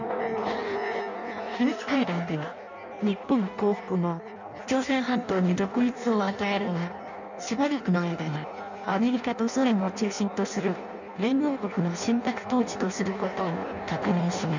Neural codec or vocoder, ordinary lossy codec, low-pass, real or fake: codec, 16 kHz in and 24 kHz out, 0.6 kbps, FireRedTTS-2 codec; none; 7.2 kHz; fake